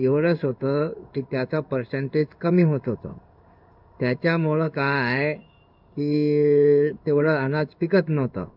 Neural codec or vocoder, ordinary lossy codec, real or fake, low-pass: codec, 16 kHz in and 24 kHz out, 1 kbps, XY-Tokenizer; none; fake; 5.4 kHz